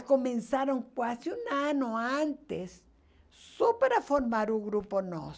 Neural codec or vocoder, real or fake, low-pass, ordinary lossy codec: none; real; none; none